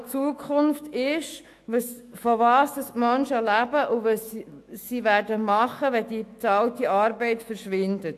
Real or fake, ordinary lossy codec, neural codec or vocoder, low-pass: fake; AAC, 64 kbps; autoencoder, 48 kHz, 128 numbers a frame, DAC-VAE, trained on Japanese speech; 14.4 kHz